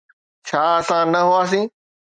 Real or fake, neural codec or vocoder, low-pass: real; none; 9.9 kHz